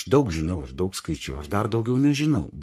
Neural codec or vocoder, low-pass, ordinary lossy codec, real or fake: codec, 44.1 kHz, 3.4 kbps, Pupu-Codec; 14.4 kHz; MP3, 64 kbps; fake